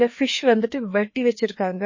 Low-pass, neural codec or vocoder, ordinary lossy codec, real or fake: 7.2 kHz; codec, 16 kHz, 2 kbps, FreqCodec, larger model; MP3, 32 kbps; fake